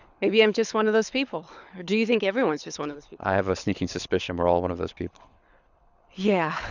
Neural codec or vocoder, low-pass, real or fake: codec, 24 kHz, 6 kbps, HILCodec; 7.2 kHz; fake